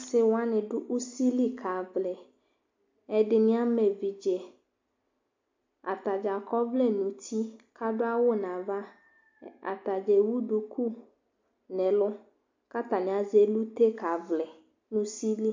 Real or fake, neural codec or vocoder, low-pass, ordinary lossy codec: real; none; 7.2 kHz; MP3, 48 kbps